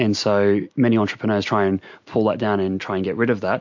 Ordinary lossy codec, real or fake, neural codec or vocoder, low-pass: MP3, 48 kbps; real; none; 7.2 kHz